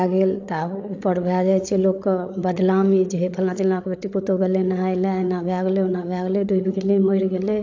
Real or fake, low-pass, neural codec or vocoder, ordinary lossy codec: fake; 7.2 kHz; codec, 16 kHz, 8 kbps, FreqCodec, larger model; AAC, 48 kbps